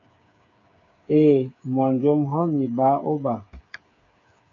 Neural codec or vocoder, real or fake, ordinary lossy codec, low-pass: codec, 16 kHz, 8 kbps, FreqCodec, smaller model; fake; MP3, 64 kbps; 7.2 kHz